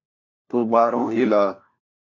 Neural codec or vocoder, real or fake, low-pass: codec, 16 kHz, 1 kbps, FunCodec, trained on LibriTTS, 50 frames a second; fake; 7.2 kHz